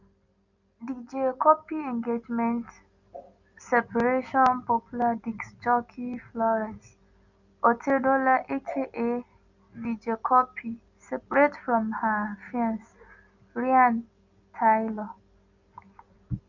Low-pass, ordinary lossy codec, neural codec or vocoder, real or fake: 7.2 kHz; none; none; real